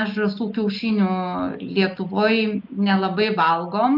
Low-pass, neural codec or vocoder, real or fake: 5.4 kHz; none; real